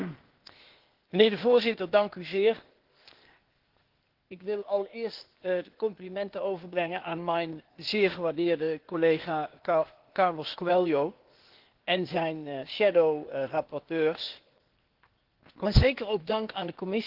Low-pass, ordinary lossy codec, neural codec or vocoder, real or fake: 5.4 kHz; Opus, 16 kbps; codec, 16 kHz, 0.8 kbps, ZipCodec; fake